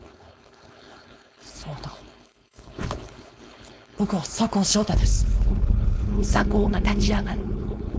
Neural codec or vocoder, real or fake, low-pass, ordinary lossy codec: codec, 16 kHz, 4.8 kbps, FACodec; fake; none; none